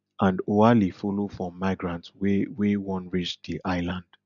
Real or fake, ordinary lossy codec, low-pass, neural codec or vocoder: real; none; 7.2 kHz; none